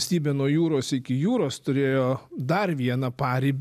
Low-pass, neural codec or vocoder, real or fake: 14.4 kHz; none; real